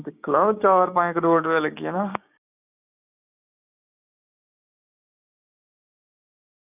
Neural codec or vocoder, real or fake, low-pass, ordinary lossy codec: codec, 16 kHz, 6 kbps, DAC; fake; 3.6 kHz; none